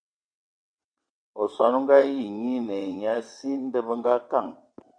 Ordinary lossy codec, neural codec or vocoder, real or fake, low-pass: MP3, 64 kbps; vocoder, 22.05 kHz, 80 mel bands, WaveNeXt; fake; 9.9 kHz